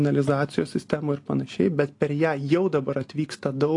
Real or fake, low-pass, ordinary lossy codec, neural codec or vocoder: real; 10.8 kHz; AAC, 48 kbps; none